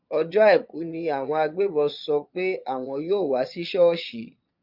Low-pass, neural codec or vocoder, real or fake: 5.4 kHz; vocoder, 22.05 kHz, 80 mel bands, Vocos; fake